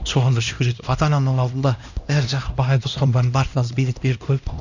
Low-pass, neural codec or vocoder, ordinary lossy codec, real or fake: 7.2 kHz; codec, 16 kHz, 1 kbps, X-Codec, HuBERT features, trained on LibriSpeech; none; fake